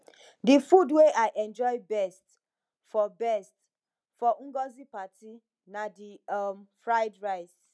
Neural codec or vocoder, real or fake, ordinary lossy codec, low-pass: none; real; none; none